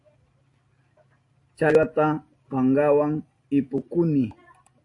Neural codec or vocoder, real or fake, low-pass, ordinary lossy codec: none; real; 10.8 kHz; AAC, 48 kbps